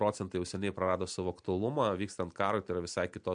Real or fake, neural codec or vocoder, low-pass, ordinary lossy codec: real; none; 9.9 kHz; MP3, 64 kbps